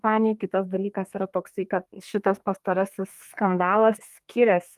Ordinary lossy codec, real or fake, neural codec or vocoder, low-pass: Opus, 32 kbps; fake; codec, 32 kHz, 1.9 kbps, SNAC; 14.4 kHz